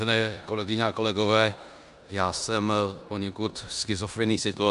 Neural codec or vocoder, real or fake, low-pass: codec, 16 kHz in and 24 kHz out, 0.9 kbps, LongCat-Audio-Codec, four codebook decoder; fake; 10.8 kHz